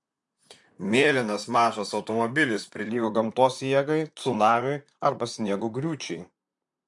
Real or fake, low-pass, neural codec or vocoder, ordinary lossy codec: fake; 10.8 kHz; vocoder, 44.1 kHz, 128 mel bands, Pupu-Vocoder; MP3, 64 kbps